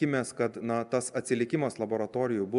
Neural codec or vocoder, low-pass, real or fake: none; 10.8 kHz; real